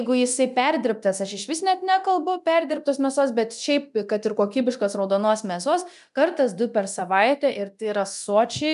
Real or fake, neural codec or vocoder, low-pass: fake; codec, 24 kHz, 0.9 kbps, DualCodec; 10.8 kHz